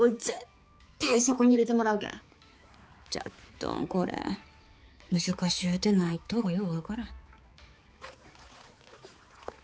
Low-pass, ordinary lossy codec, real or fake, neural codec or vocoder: none; none; fake; codec, 16 kHz, 4 kbps, X-Codec, HuBERT features, trained on balanced general audio